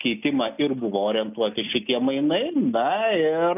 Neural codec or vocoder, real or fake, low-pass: none; real; 3.6 kHz